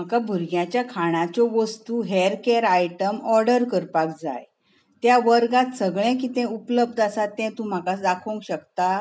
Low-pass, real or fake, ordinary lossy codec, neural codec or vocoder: none; real; none; none